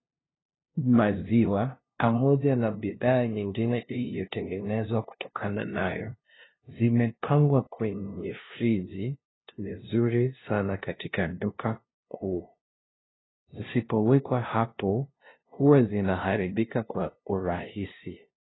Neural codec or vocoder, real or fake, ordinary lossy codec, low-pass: codec, 16 kHz, 0.5 kbps, FunCodec, trained on LibriTTS, 25 frames a second; fake; AAC, 16 kbps; 7.2 kHz